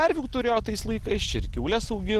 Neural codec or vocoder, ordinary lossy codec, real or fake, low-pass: none; Opus, 16 kbps; real; 14.4 kHz